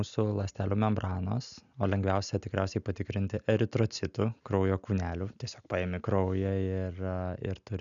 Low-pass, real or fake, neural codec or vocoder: 7.2 kHz; real; none